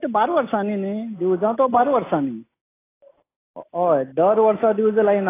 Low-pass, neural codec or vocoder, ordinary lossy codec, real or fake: 3.6 kHz; none; AAC, 16 kbps; real